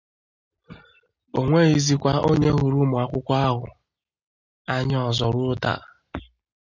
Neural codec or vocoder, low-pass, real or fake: none; 7.2 kHz; real